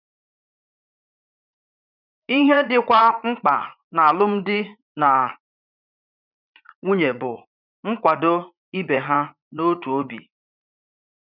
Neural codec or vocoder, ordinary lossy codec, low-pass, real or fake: vocoder, 44.1 kHz, 80 mel bands, Vocos; none; 5.4 kHz; fake